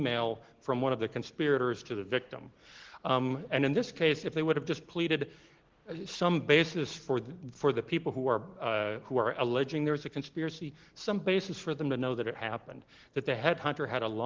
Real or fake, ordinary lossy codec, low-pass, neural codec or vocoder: real; Opus, 16 kbps; 7.2 kHz; none